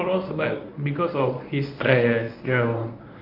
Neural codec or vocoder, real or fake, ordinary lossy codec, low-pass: codec, 24 kHz, 0.9 kbps, WavTokenizer, medium speech release version 1; fake; none; 5.4 kHz